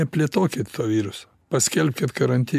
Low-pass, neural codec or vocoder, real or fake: 14.4 kHz; none; real